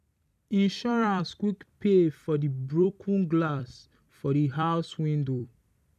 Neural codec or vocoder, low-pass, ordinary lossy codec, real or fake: vocoder, 44.1 kHz, 128 mel bands every 256 samples, BigVGAN v2; 14.4 kHz; none; fake